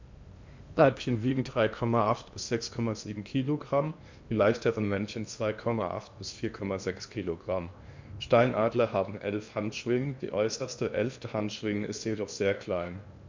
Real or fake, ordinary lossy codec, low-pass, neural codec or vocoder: fake; MP3, 64 kbps; 7.2 kHz; codec, 16 kHz in and 24 kHz out, 0.8 kbps, FocalCodec, streaming, 65536 codes